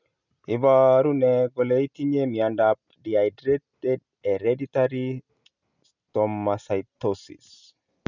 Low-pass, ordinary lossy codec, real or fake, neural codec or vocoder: 7.2 kHz; none; real; none